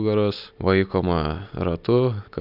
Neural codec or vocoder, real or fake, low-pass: none; real; 5.4 kHz